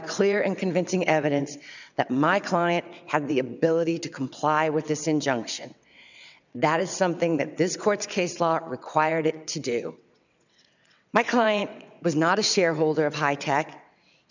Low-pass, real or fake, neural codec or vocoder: 7.2 kHz; fake; vocoder, 22.05 kHz, 80 mel bands, WaveNeXt